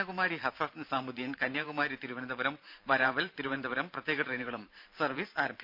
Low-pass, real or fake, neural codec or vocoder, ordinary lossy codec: 5.4 kHz; fake; vocoder, 44.1 kHz, 128 mel bands every 512 samples, BigVGAN v2; none